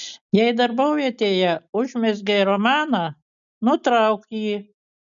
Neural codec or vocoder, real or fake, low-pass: none; real; 7.2 kHz